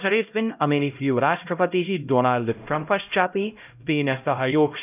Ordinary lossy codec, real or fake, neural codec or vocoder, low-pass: none; fake; codec, 16 kHz, 0.5 kbps, X-Codec, HuBERT features, trained on LibriSpeech; 3.6 kHz